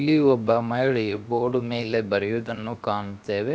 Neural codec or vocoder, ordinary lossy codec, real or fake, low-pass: codec, 16 kHz, about 1 kbps, DyCAST, with the encoder's durations; none; fake; none